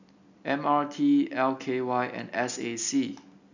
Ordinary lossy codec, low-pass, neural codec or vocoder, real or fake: none; 7.2 kHz; none; real